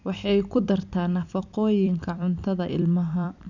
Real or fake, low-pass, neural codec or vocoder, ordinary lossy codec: fake; 7.2 kHz; vocoder, 44.1 kHz, 128 mel bands every 256 samples, BigVGAN v2; none